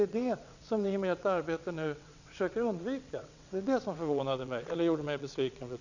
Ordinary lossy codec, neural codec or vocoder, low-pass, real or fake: none; vocoder, 22.05 kHz, 80 mel bands, WaveNeXt; 7.2 kHz; fake